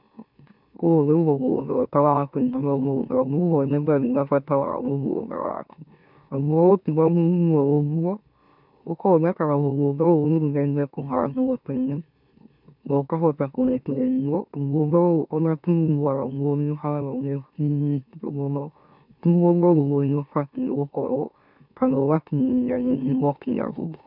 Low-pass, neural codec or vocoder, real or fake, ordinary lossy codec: 5.4 kHz; autoencoder, 44.1 kHz, a latent of 192 numbers a frame, MeloTTS; fake; none